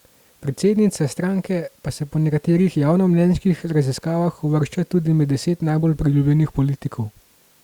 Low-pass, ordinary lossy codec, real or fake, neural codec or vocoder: 19.8 kHz; Opus, 64 kbps; real; none